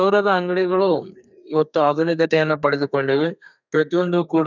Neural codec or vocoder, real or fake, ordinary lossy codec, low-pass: codec, 32 kHz, 1.9 kbps, SNAC; fake; none; 7.2 kHz